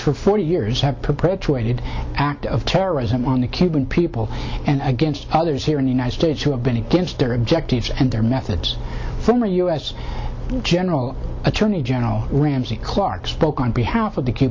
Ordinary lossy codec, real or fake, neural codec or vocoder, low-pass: MP3, 48 kbps; real; none; 7.2 kHz